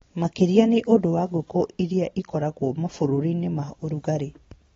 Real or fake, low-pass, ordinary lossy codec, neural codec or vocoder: real; 7.2 kHz; AAC, 24 kbps; none